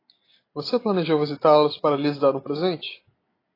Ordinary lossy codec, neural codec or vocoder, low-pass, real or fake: AAC, 24 kbps; none; 5.4 kHz; real